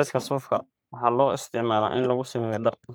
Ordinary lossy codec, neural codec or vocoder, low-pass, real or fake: none; codec, 44.1 kHz, 3.4 kbps, Pupu-Codec; none; fake